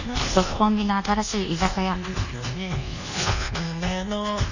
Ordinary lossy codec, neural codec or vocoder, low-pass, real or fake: none; codec, 24 kHz, 1.2 kbps, DualCodec; 7.2 kHz; fake